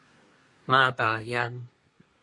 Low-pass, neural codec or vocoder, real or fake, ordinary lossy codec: 10.8 kHz; codec, 24 kHz, 1 kbps, SNAC; fake; MP3, 48 kbps